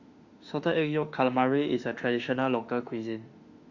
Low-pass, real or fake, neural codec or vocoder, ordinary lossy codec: 7.2 kHz; fake; autoencoder, 48 kHz, 32 numbers a frame, DAC-VAE, trained on Japanese speech; Opus, 64 kbps